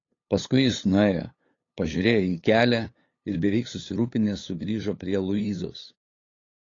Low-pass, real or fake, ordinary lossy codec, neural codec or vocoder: 7.2 kHz; fake; AAC, 32 kbps; codec, 16 kHz, 8 kbps, FunCodec, trained on LibriTTS, 25 frames a second